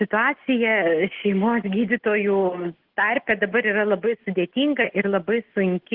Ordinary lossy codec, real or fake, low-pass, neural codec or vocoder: Opus, 16 kbps; real; 5.4 kHz; none